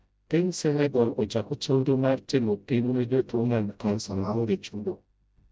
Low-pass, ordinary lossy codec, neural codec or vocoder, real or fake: none; none; codec, 16 kHz, 0.5 kbps, FreqCodec, smaller model; fake